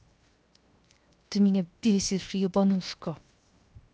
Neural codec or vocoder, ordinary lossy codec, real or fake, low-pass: codec, 16 kHz, 0.7 kbps, FocalCodec; none; fake; none